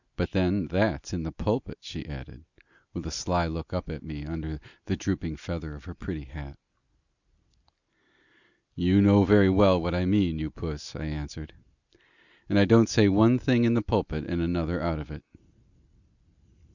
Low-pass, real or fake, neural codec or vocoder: 7.2 kHz; real; none